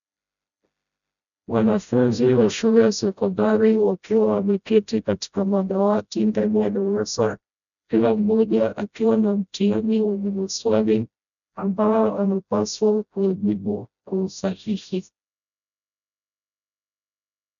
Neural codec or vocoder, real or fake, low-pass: codec, 16 kHz, 0.5 kbps, FreqCodec, smaller model; fake; 7.2 kHz